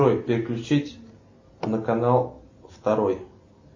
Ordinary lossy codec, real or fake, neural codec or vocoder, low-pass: MP3, 32 kbps; real; none; 7.2 kHz